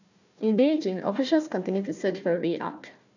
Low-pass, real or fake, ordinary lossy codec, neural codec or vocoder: 7.2 kHz; fake; none; codec, 16 kHz, 1 kbps, FunCodec, trained on Chinese and English, 50 frames a second